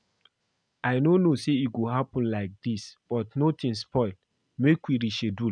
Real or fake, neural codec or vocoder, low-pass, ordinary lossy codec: real; none; 9.9 kHz; none